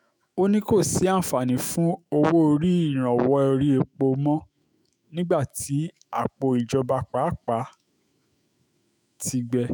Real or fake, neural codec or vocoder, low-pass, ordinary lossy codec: fake; autoencoder, 48 kHz, 128 numbers a frame, DAC-VAE, trained on Japanese speech; none; none